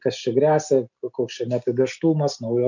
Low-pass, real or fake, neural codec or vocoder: 7.2 kHz; real; none